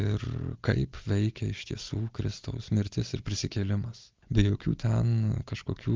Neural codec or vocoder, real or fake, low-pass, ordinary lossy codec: none; real; 7.2 kHz; Opus, 24 kbps